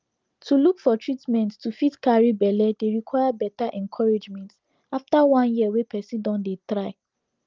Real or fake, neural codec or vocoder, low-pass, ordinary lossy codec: real; none; 7.2 kHz; Opus, 24 kbps